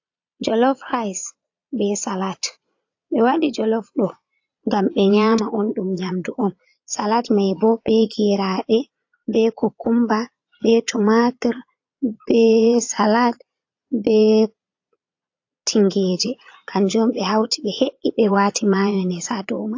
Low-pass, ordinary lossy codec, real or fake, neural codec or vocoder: 7.2 kHz; AAC, 48 kbps; fake; vocoder, 22.05 kHz, 80 mel bands, Vocos